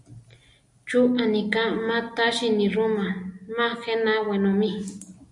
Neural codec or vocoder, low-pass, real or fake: none; 10.8 kHz; real